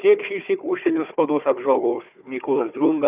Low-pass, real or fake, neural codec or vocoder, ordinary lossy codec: 3.6 kHz; fake; codec, 16 kHz, 4 kbps, FunCodec, trained on Chinese and English, 50 frames a second; Opus, 64 kbps